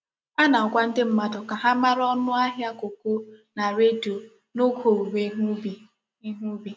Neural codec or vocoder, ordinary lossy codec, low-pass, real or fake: none; none; none; real